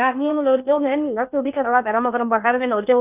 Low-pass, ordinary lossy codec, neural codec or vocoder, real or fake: 3.6 kHz; none; codec, 16 kHz in and 24 kHz out, 0.6 kbps, FocalCodec, streaming, 4096 codes; fake